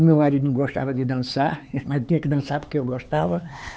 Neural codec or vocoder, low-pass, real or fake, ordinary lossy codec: codec, 16 kHz, 4 kbps, X-Codec, HuBERT features, trained on LibriSpeech; none; fake; none